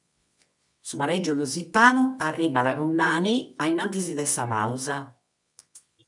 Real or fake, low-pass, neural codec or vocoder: fake; 10.8 kHz; codec, 24 kHz, 0.9 kbps, WavTokenizer, medium music audio release